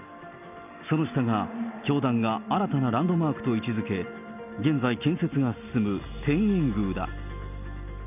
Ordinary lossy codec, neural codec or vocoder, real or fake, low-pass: none; none; real; 3.6 kHz